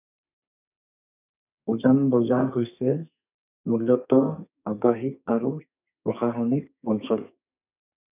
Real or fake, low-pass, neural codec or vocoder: fake; 3.6 kHz; codec, 32 kHz, 1.9 kbps, SNAC